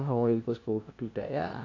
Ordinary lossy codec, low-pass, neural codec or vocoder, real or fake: AAC, 48 kbps; 7.2 kHz; codec, 16 kHz, 0.5 kbps, FunCodec, trained on LibriTTS, 25 frames a second; fake